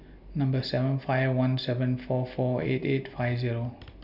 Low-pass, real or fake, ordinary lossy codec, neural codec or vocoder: 5.4 kHz; real; none; none